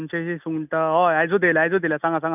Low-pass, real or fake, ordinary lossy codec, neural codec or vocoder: 3.6 kHz; real; none; none